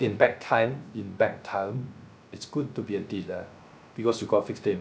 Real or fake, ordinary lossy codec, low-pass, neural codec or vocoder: fake; none; none; codec, 16 kHz, 0.3 kbps, FocalCodec